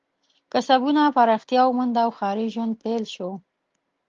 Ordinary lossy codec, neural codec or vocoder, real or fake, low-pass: Opus, 32 kbps; none; real; 7.2 kHz